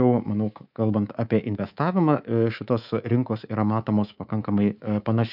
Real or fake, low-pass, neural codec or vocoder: fake; 5.4 kHz; codec, 24 kHz, 3.1 kbps, DualCodec